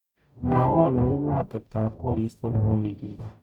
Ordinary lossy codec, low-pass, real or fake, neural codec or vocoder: none; 19.8 kHz; fake; codec, 44.1 kHz, 0.9 kbps, DAC